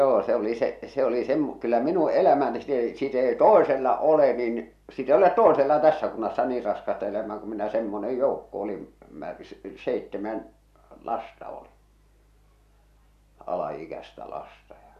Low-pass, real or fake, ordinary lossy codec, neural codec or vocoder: 14.4 kHz; real; AAC, 96 kbps; none